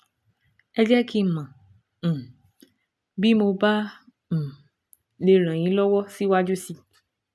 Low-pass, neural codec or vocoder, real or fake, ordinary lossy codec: none; none; real; none